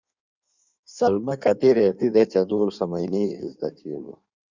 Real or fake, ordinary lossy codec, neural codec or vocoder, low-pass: fake; Opus, 64 kbps; codec, 16 kHz in and 24 kHz out, 1.1 kbps, FireRedTTS-2 codec; 7.2 kHz